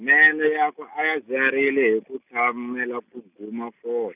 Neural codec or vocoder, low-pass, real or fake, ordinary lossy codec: none; 3.6 kHz; real; none